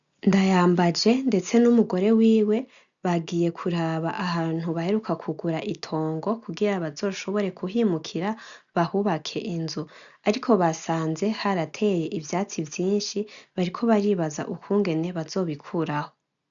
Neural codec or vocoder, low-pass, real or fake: none; 7.2 kHz; real